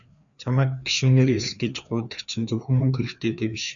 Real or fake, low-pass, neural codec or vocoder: fake; 7.2 kHz; codec, 16 kHz, 2 kbps, FreqCodec, larger model